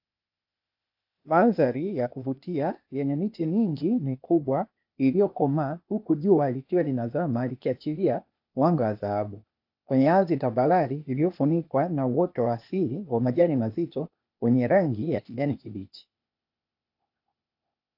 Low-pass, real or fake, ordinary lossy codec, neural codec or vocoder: 5.4 kHz; fake; AAC, 48 kbps; codec, 16 kHz, 0.8 kbps, ZipCodec